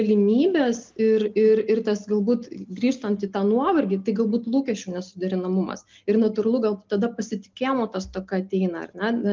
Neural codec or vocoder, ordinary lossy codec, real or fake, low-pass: none; Opus, 32 kbps; real; 7.2 kHz